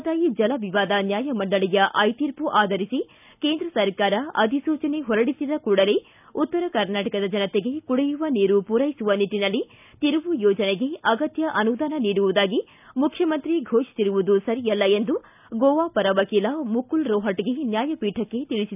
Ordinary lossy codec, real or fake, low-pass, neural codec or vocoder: none; real; 3.6 kHz; none